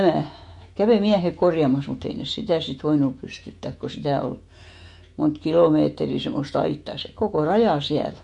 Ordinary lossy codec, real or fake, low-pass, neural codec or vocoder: MP3, 48 kbps; fake; 10.8 kHz; autoencoder, 48 kHz, 128 numbers a frame, DAC-VAE, trained on Japanese speech